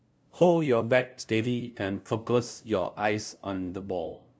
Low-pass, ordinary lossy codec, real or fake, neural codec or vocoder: none; none; fake; codec, 16 kHz, 0.5 kbps, FunCodec, trained on LibriTTS, 25 frames a second